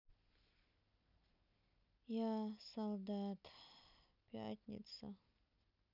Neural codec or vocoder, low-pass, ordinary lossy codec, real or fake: none; 5.4 kHz; none; real